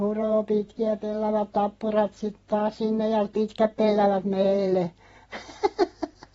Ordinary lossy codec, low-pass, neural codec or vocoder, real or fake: AAC, 24 kbps; 19.8 kHz; vocoder, 44.1 kHz, 128 mel bands every 512 samples, BigVGAN v2; fake